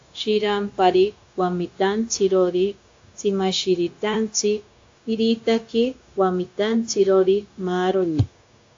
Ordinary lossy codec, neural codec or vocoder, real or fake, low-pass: AAC, 48 kbps; codec, 16 kHz, 0.9 kbps, LongCat-Audio-Codec; fake; 7.2 kHz